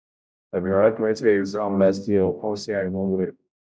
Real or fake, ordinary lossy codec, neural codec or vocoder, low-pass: fake; none; codec, 16 kHz, 0.5 kbps, X-Codec, HuBERT features, trained on general audio; none